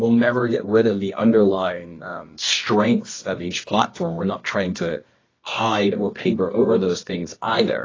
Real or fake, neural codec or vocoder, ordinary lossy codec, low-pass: fake; codec, 24 kHz, 0.9 kbps, WavTokenizer, medium music audio release; AAC, 32 kbps; 7.2 kHz